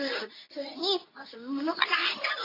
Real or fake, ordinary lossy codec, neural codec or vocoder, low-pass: fake; AAC, 32 kbps; codec, 24 kHz, 0.9 kbps, WavTokenizer, medium speech release version 2; 5.4 kHz